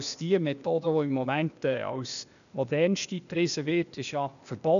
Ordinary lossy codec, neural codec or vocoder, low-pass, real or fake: none; codec, 16 kHz, 0.8 kbps, ZipCodec; 7.2 kHz; fake